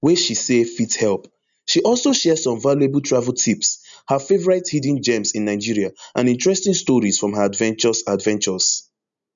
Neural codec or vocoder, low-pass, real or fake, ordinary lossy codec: none; 7.2 kHz; real; none